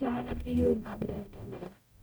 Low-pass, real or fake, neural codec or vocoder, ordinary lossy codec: none; fake; codec, 44.1 kHz, 0.9 kbps, DAC; none